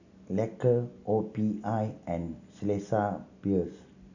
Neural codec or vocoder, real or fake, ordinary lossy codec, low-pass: none; real; none; 7.2 kHz